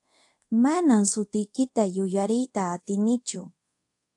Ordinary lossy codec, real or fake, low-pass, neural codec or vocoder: AAC, 64 kbps; fake; 10.8 kHz; codec, 24 kHz, 0.5 kbps, DualCodec